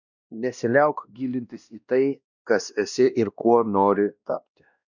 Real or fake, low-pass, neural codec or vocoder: fake; 7.2 kHz; codec, 16 kHz, 1 kbps, X-Codec, WavLM features, trained on Multilingual LibriSpeech